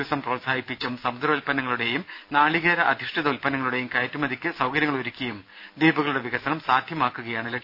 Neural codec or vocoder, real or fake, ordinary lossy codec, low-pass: none; real; none; 5.4 kHz